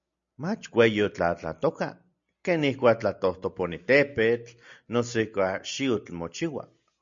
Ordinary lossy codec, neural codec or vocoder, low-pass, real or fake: MP3, 64 kbps; none; 7.2 kHz; real